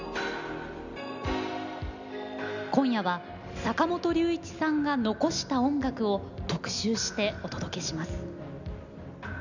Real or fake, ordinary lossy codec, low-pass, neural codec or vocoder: real; none; 7.2 kHz; none